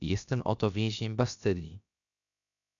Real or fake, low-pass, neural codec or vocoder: fake; 7.2 kHz; codec, 16 kHz, about 1 kbps, DyCAST, with the encoder's durations